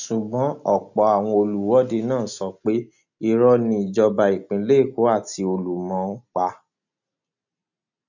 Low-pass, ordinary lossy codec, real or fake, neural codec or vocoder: 7.2 kHz; none; real; none